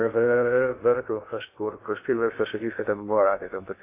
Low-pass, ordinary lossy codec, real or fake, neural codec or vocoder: 3.6 kHz; AAC, 24 kbps; fake; codec, 16 kHz in and 24 kHz out, 0.6 kbps, FocalCodec, streaming, 2048 codes